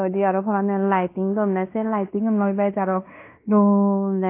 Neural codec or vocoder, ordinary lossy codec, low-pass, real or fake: codec, 16 kHz in and 24 kHz out, 0.9 kbps, LongCat-Audio-Codec, fine tuned four codebook decoder; AAC, 24 kbps; 3.6 kHz; fake